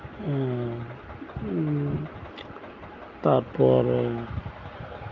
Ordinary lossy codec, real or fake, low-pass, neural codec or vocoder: Opus, 24 kbps; real; 7.2 kHz; none